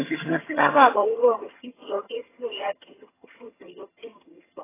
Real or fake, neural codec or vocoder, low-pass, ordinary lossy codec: fake; vocoder, 22.05 kHz, 80 mel bands, HiFi-GAN; 3.6 kHz; AAC, 16 kbps